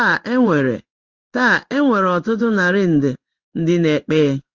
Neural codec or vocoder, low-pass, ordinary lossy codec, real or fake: codec, 16 kHz in and 24 kHz out, 1 kbps, XY-Tokenizer; 7.2 kHz; Opus, 32 kbps; fake